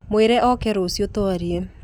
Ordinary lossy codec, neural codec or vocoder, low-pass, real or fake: none; none; 19.8 kHz; real